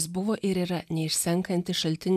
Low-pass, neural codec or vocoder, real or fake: 14.4 kHz; none; real